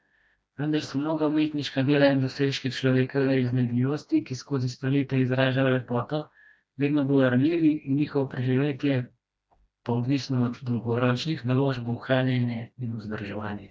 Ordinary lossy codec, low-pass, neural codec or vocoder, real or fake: none; none; codec, 16 kHz, 1 kbps, FreqCodec, smaller model; fake